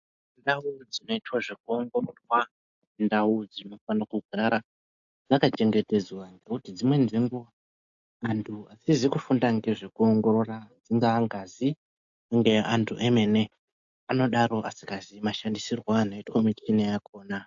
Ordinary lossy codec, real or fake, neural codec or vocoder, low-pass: AAC, 64 kbps; real; none; 7.2 kHz